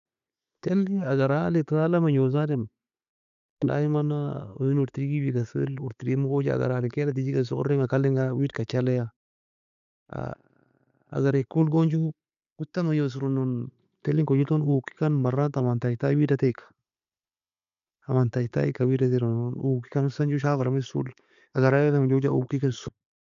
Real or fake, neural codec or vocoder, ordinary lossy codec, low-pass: real; none; none; 7.2 kHz